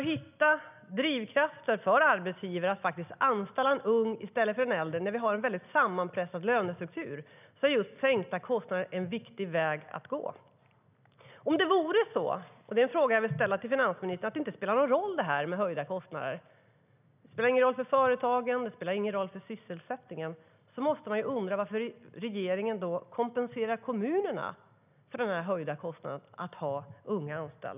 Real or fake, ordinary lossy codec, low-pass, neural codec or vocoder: real; none; 3.6 kHz; none